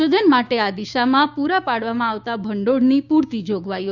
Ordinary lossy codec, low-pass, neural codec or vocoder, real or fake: Opus, 64 kbps; 7.2 kHz; codec, 16 kHz, 6 kbps, DAC; fake